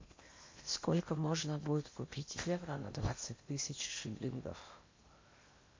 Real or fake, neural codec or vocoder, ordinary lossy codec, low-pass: fake; codec, 16 kHz in and 24 kHz out, 0.8 kbps, FocalCodec, streaming, 65536 codes; MP3, 48 kbps; 7.2 kHz